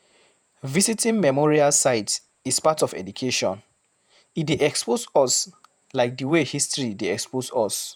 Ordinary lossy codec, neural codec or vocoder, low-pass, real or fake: none; vocoder, 48 kHz, 128 mel bands, Vocos; none; fake